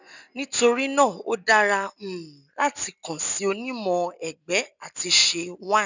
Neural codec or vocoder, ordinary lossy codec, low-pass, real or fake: none; none; 7.2 kHz; real